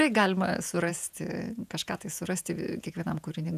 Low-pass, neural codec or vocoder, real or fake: 14.4 kHz; vocoder, 48 kHz, 128 mel bands, Vocos; fake